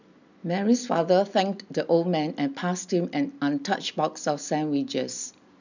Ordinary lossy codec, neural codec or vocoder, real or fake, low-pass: none; none; real; 7.2 kHz